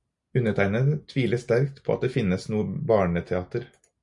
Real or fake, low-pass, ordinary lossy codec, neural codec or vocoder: real; 10.8 kHz; MP3, 96 kbps; none